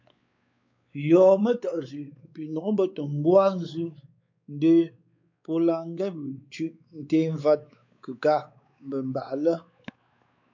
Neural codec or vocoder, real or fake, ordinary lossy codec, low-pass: codec, 16 kHz, 4 kbps, X-Codec, WavLM features, trained on Multilingual LibriSpeech; fake; MP3, 64 kbps; 7.2 kHz